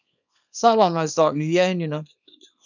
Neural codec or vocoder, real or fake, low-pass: codec, 24 kHz, 0.9 kbps, WavTokenizer, small release; fake; 7.2 kHz